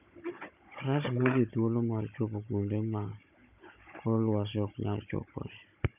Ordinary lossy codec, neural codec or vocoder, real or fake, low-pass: none; codec, 16 kHz, 16 kbps, FunCodec, trained on Chinese and English, 50 frames a second; fake; 3.6 kHz